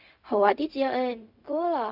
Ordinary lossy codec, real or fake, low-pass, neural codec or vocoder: none; fake; 5.4 kHz; codec, 16 kHz, 0.4 kbps, LongCat-Audio-Codec